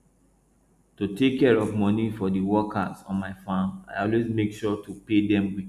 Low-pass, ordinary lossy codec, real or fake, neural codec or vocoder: 14.4 kHz; none; real; none